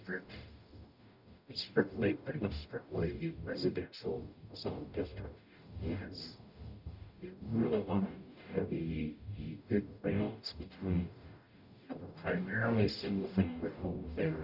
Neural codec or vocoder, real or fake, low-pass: codec, 44.1 kHz, 0.9 kbps, DAC; fake; 5.4 kHz